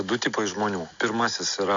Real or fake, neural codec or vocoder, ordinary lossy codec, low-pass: real; none; MP3, 48 kbps; 7.2 kHz